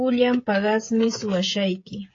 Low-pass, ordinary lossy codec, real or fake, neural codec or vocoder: 7.2 kHz; AAC, 32 kbps; fake; codec, 16 kHz, 16 kbps, FreqCodec, smaller model